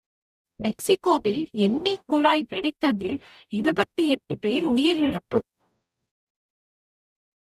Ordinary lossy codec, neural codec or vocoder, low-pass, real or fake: none; codec, 44.1 kHz, 0.9 kbps, DAC; 14.4 kHz; fake